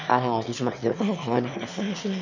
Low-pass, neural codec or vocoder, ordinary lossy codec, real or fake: 7.2 kHz; autoencoder, 22.05 kHz, a latent of 192 numbers a frame, VITS, trained on one speaker; none; fake